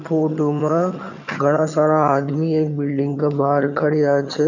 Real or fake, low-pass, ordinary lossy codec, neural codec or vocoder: fake; 7.2 kHz; none; vocoder, 22.05 kHz, 80 mel bands, HiFi-GAN